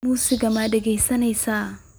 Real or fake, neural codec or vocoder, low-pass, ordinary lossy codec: real; none; none; none